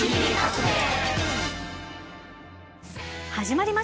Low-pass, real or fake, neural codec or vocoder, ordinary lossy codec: none; real; none; none